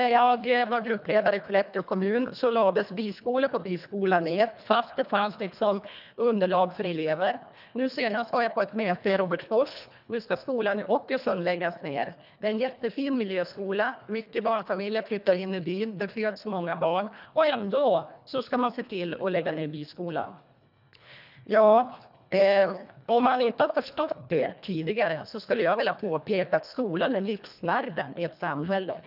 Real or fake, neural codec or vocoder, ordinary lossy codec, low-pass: fake; codec, 24 kHz, 1.5 kbps, HILCodec; none; 5.4 kHz